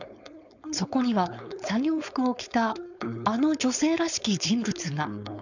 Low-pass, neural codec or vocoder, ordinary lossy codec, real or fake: 7.2 kHz; codec, 16 kHz, 4.8 kbps, FACodec; none; fake